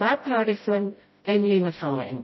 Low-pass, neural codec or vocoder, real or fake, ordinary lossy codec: 7.2 kHz; codec, 16 kHz, 0.5 kbps, FreqCodec, smaller model; fake; MP3, 24 kbps